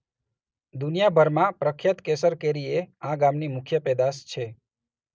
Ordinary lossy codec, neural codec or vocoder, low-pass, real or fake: none; none; none; real